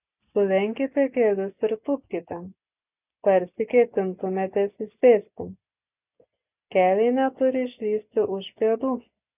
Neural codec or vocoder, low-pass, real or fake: none; 3.6 kHz; real